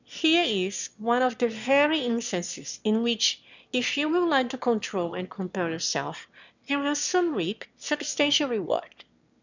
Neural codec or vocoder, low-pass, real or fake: autoencoder, 22.05 kHz, a latent of 192 numbers a frame, VITS, trained on one speaker; 7.2 kHz; fake